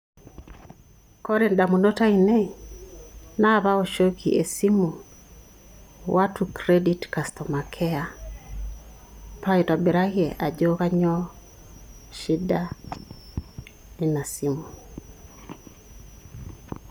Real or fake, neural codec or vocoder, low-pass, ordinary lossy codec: real; none; 19.8 kHz; none